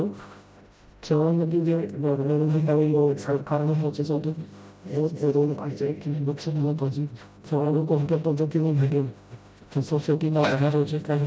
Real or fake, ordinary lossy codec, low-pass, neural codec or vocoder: fake; none; none; codec, 16 kHz, 0.5 kbps, FreqCodec, smaller model